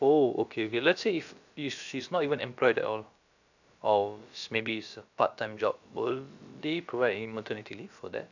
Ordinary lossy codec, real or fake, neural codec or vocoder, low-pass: none; fake; codec, 16 kHz, about 1 kbps, DyCAST, with the encoder's durations; 7.2 kHz